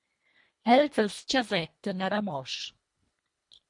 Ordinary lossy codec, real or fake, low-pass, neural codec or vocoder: MP3, 48 kbps; fake; 10.8 kHz; codec, 24 kHz, 1.5 kbps, HILCodec